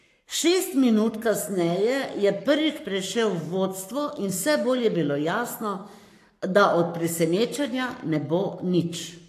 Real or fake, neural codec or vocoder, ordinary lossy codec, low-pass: fake; codec, 44.1 kHz, 7.8 kbps, Pupu-Codec; AAC, 64 kbps; 14.4 kHz